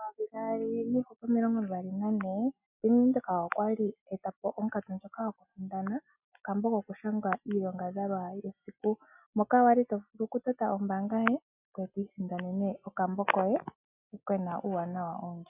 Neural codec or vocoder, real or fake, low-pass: none; real; 3.6 kHz